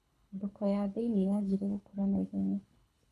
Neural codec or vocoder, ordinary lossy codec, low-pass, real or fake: codec, 24 kHz, 6 kbps, HILCodec; none; none; fake